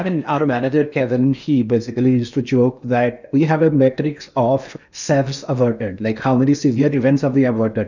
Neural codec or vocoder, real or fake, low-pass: codec, 16 kHz in and 24 kHz out, 0.8 kbps, FocalCodec, streaming, 65536 codes; fake; 7.2 kHz